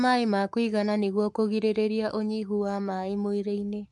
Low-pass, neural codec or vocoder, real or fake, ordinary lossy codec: 10.8 kHz; codec, 44.1 kHz, 7.8 kbps, Pupu-Codec; fake; MP3, 64 kbps